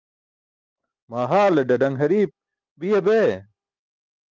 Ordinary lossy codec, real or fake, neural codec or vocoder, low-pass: Opus, 24 kbps; real; none; 7.2 kHz